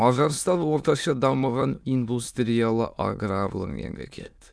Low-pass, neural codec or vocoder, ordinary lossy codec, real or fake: none; autoencoder, 22.05 kHz, a latent of 192 numbers a frame, VITS, trained on many speakers; none; fake